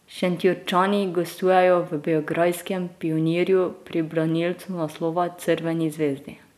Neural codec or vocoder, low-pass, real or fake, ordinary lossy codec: none; 14.4 kHz; real; none